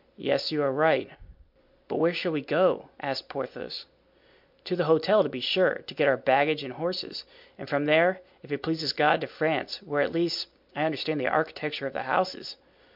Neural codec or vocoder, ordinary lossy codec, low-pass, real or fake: none; MP3, 48 kbps; 5.4 kHz; real